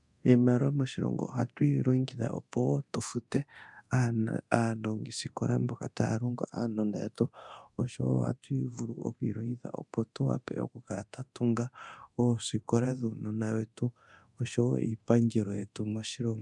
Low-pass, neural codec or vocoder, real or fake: 10.8 kHz; codec, 24 kHz, 0.9 kbps, DualCodec; fake